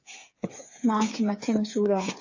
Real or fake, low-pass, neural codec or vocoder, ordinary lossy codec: fake; 7.2 kHz; codec, 16 kHz, 8 kbps, FreqCodec, smaller model; AAC, 48 kbps